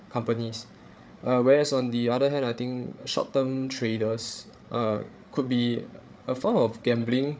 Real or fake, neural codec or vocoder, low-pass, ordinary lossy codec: fake; codec, 16 kHz, 8 kbps, FreqCodec, larger model; none; none